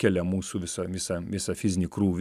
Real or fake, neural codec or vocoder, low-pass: real; none; 14.4 kHz